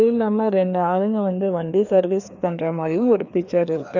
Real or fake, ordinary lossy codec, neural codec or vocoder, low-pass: fake; none; codec, 16 kHz, 2 kbps, FreqCodec, larger model; 7.2 kHz